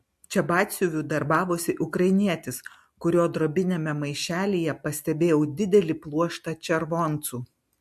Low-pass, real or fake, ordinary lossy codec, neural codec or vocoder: 14.4 kHz; real; MP3, 64 kbps; none